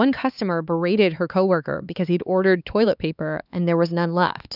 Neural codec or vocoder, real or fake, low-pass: codec, 16 kHz, 4 kbps, X-Codec, HuBERT features, trained on LibriSpeech; fake; 5.4 kHz